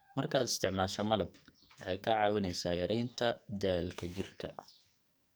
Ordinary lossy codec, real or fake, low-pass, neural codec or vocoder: none; fake; none; codec, 44.1 kHz, 2.6 kbps, SNAC